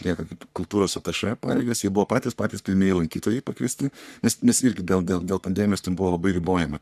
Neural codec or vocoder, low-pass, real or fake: codec, 44.1 kHz, 3.4 kbps, Pupu-Codec; 14.4 kHz; fake